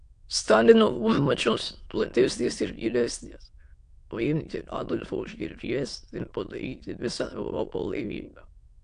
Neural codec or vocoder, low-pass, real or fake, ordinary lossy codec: autoencoder, 22.05 kHz, a latent of 192 numbers a frame, VITS, trained on many speakers; 9.9 kHz; fake; AAC, 64 kbps